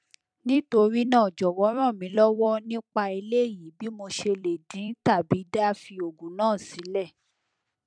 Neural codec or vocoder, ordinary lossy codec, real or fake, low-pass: vocoder, 44.1 kHz, 128 mel bands every 256 samples, BigVGAN v2; none; fake; 9.9 kHz